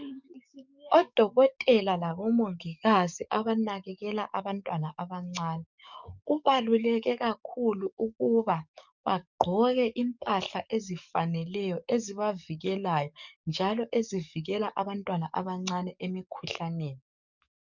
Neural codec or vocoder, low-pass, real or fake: codec, 44.1 kHz, 7.8 kbps, DAC; 7.2 kHz; fake